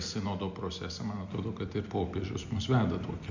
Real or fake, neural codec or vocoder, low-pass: real; none; 7.2 kHz